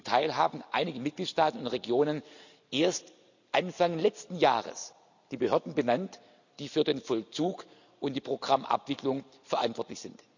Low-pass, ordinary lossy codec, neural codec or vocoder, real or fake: 7.2 kHz; none; none; real